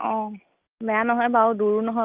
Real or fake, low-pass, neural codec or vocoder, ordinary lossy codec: real; 3.6 kHz; none; Opus, 24 kbps